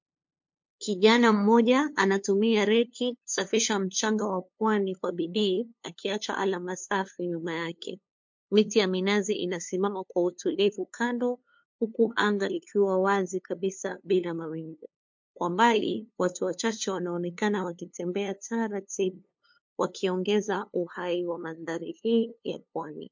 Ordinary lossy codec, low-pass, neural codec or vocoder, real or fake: MP3, 48 kbps; 7.2 kHz; codec, 16 kHz, 2 kbps, FunCodec, trained on LibriTTS, 25 frames a second; fake